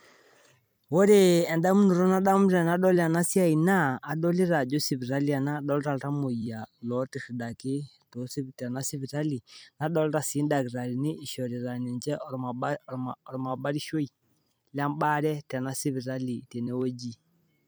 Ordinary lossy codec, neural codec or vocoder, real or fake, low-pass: none; vocoder, 44.1 kHz, 128 mel bands every 256 samples, BigVGAN v2; fake; none